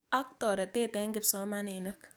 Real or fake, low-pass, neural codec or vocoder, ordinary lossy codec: fake; none; codec, 44.1 kHz, 7.8 kbps, DAC; none